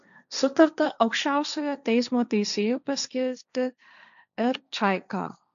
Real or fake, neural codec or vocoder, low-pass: fake; codec, 16 kHz, 1.1 kbps, Voila-Tokenizer; 7.2 kHz